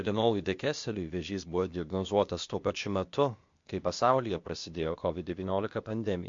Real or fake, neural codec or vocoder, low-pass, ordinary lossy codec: fake; codec, 16 kHz, 0.8 kbps, ZipCodec; 7.2 kHz; MP3, 48 kbps